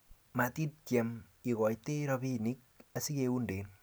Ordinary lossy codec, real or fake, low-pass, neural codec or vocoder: none; real; none; none